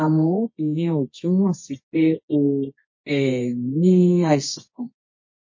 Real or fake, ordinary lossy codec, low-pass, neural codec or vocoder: fake; MP3, 32 kbps; 7.2 kHz; codec, 24 kHz, 0.9 kbps, WavTokenizer, medium music audio release